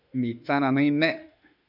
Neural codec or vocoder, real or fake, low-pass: autoencoder, 48 kHz, 32 numbers a frame, DAC-VAE, trained on Japanese speech; fake; 5.4 kHz